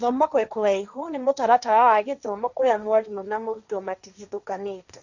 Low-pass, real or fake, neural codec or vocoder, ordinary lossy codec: 7.2 kHz; fake; codec, 16 kHz, 1.1 kbps, Voila-Tokenizer; none